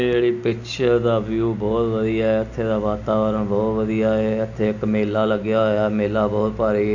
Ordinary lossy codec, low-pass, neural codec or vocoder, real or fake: none; 7.2 kHz; none; real